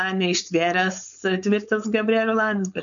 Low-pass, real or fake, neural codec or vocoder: 7.2 kHz; fake; codec, 16 kHz, 4.8 kbps, FACodec